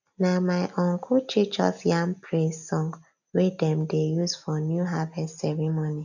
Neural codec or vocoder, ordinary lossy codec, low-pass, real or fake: none; none; 7.2 kHz; real